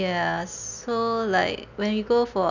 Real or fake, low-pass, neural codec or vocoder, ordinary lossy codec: real; 7.2 kHz; none; none